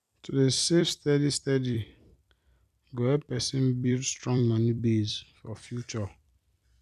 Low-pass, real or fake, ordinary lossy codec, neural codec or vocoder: 14.4 kHz; fake; none; vocoder, 44.1 kHz, 128 mel bands every 256 samples, BigVGAN v2